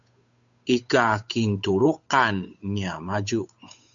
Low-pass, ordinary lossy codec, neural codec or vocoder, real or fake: 7.2 kHz; MP3, 64 kbps; codec, 16 kHz, 8 kbps, FunCodec, trained on Chinese and English, 25 frames a second; fake